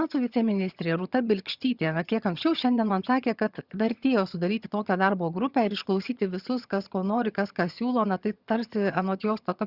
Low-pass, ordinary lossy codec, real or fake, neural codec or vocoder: 5.4 kHz; Opus, 64 kbps; fake; vocoder, 22.05 kHz, 80 mel bands, HiFi-GAN